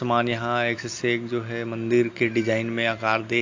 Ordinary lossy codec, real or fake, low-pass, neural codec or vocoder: AAC, 48 kbps; real; 7.2 kHz; none